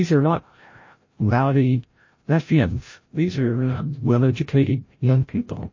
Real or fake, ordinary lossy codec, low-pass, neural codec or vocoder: fake; MP3, 32 kbps; 7.2 kHz; codec, 16 kHz, 0.5 kbps, FreqCodec, larger model